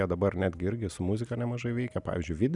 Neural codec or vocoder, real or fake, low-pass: none; real; 10.8 kHz